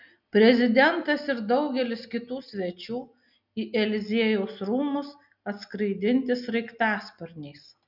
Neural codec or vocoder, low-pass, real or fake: none; 5.4 kHz; real